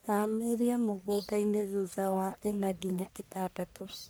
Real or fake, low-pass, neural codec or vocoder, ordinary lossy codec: fake; none; codec, 44.1 kHz, 1.7 kbps, Pupu-Codec; none